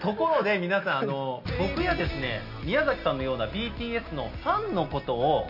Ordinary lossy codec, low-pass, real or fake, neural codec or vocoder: none; 5.4 kHz; real; none